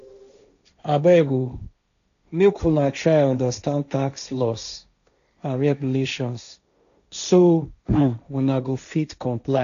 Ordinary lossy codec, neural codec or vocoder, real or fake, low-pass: none; codec, 16 kHz, 1.1 kbps, Voila-Tokenizer; fake; 7.2 kHz